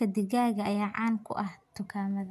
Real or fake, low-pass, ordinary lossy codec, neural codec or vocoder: real; 14.4 kHz; none; none